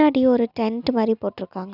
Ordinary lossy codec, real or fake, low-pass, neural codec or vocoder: none; real; 5.4 kHz; none